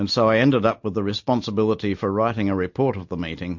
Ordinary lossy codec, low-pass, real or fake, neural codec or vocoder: MP3, 48 kbps; 7.2 kHz; real; none